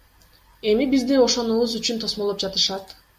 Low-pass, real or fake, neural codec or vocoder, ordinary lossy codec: 14.4 kHz; real; none; MP3, 64 kbps